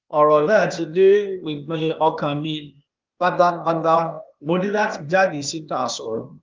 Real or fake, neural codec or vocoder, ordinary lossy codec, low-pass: fake; codec, 16 kHz, 0.8 kbps, ZipCodec; Opus, 32 kbps; 7.2 kHz